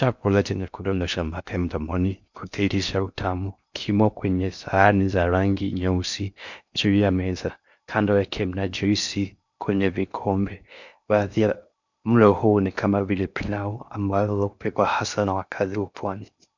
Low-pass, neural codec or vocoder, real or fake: 7.2 kHz; codec, 16 kHz in and 24 kHz out, 0.6 kbps, FocalCodec, streaming, 2048 codes; fake